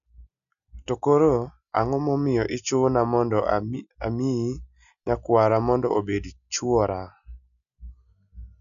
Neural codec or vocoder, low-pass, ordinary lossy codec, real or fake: none; 7.2 kHz; none; real